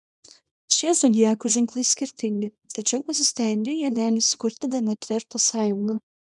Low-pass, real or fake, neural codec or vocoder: 10.8 kHz; fake; codec, 24 kHz, 0.9 kbps, WavTokenizer, small release